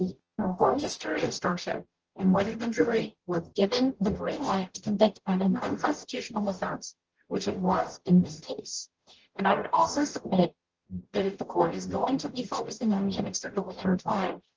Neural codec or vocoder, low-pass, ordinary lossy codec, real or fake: codec, 44.1 kHz, 0.9 kbps, DAC; 7.2 kHz; Opus, 16 kbps; fake